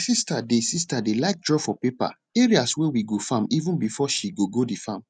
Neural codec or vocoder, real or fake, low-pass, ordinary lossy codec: none; real; 9.9 kHz; none